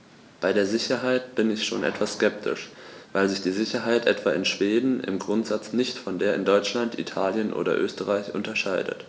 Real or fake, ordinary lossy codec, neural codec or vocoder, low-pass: real; none; none; none